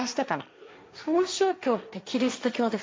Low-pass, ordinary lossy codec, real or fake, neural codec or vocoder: none; none; fake; codec, 16 kHz, 1.1 kbps, Voila-Tokenizer